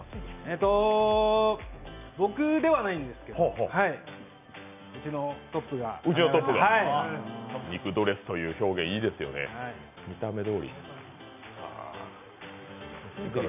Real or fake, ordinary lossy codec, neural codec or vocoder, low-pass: real; none; none; 3.6 kHz